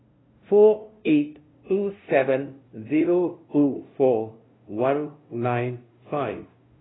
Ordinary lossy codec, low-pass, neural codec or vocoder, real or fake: AAC, 16 kbps; 7.2 kHz; codec, 16 kHz, 0.5 kbps, FunCodec, trained on LibriTTS, 25 frames a second; fake